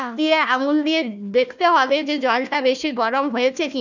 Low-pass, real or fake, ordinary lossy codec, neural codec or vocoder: 7.2 kHz; fake; none; codec, 16 kHz, 1 kbps, FunCodec, trained on Chinese and English, 50 frames a second